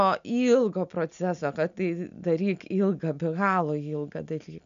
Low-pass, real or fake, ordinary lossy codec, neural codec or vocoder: 7.2 kHz; real; MP3, 96 kbps; none